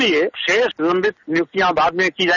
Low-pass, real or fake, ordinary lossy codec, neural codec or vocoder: 7.2 kHz; real; none; none